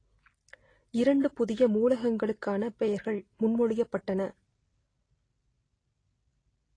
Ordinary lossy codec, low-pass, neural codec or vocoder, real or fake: AAC, 32 kbps; 9.9 kHz; none; real